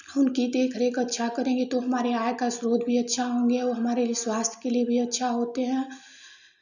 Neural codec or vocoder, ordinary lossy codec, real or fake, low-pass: none; none; real; 7.2 kHz